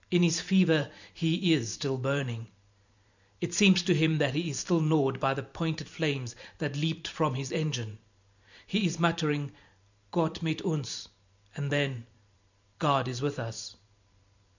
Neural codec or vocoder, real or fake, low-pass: vocoder, 44.1 kHz, 128 mel bands every 512 samples, BigVGAN v2; fake; 7.2 kHz